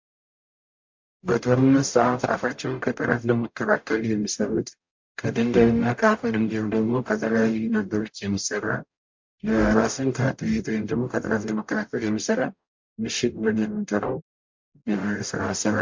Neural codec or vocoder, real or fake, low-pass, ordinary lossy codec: codec, 44.1 kHz, 0.9 kbps, DAC; fake; 7.2 kHz; MP3, 48 kbps